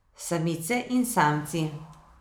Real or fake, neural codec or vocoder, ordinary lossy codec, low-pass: real; none; none; none